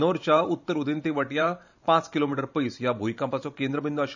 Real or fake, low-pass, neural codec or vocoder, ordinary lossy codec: fake; 7.2 kHz; vocoder, 44.1 kHz, 128 mel bands every 512 samples, BigVGAN v2; none